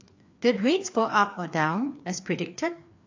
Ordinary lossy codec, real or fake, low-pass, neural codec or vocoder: AAC, 48 kbps; fake; 7.2 kHz; codec, 16 kHz, 2 kbps, FreqCodec, larger model